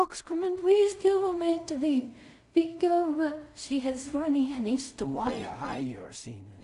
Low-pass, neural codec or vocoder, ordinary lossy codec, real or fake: 10.8 kHz; codec, 16 kHz in and 24 kHz out, 0.4 kbps, LongCat-Audio-Codec, two codebook decoder; none; fake